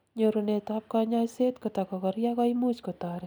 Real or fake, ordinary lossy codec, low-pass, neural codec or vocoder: real; none; none; none